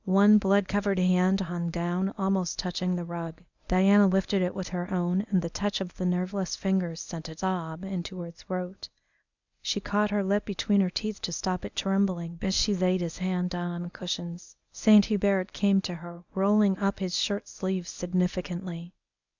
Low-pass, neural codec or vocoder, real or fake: 7.2 kHz; codec, 24 kHz, 0.9 kbps, WavTokenizer, medium speech release version 1; fake